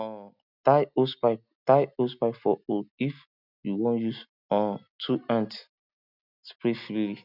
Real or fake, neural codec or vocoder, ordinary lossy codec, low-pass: real; none; none; 5.4 kHz